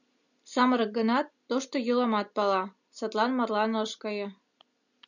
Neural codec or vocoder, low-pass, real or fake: none; 7.2 kHz; real